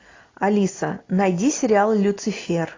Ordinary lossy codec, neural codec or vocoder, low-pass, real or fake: AAC, 32 kbps; none; 7.2 kHz; real